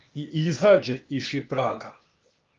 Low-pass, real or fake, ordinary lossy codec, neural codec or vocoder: 7.2 kHz; fake; Opus, 24 kbps; codec, 16 kHz, 0.8 kbps, ZipCodec